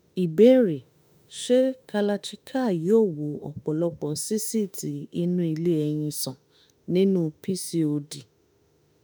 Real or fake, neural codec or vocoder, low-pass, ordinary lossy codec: fake; autoencoder, 48 kHz, 32 numbers a frame, DAC-VAE, trained on Japanese speech; none; none